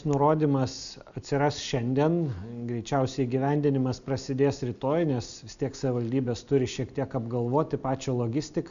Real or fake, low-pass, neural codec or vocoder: real; 7.2 kHz; none